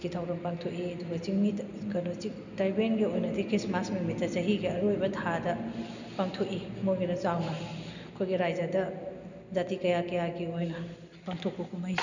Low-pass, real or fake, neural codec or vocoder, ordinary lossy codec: 7.2 kHz; real; none; none